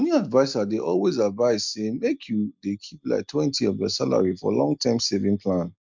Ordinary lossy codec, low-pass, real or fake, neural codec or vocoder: MP3, 64 kbps; 7.2 kHz; real; none